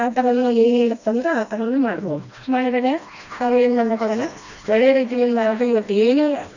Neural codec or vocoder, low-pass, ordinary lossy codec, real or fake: codec, 16 kHz, 1 kbps, FreqCodec, smaller model; 7.2 kHz; none; fake